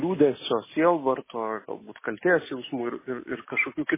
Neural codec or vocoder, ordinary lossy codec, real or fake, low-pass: none; MP3, 16 kbps; real; 3.6 kHz